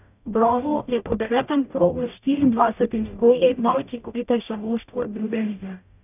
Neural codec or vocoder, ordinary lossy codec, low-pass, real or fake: codec, 44.1 kHz, 0.9 kbps, DAC; none; 3.6 kHz; fake